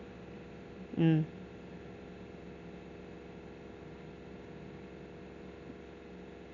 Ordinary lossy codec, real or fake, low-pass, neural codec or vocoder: none; real; 7.2 kHz; none